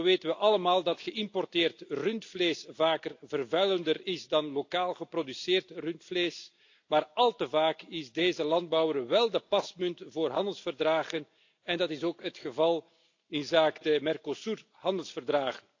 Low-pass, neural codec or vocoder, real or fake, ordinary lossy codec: 7.2 kHz; none; real; AAC, 48 kbps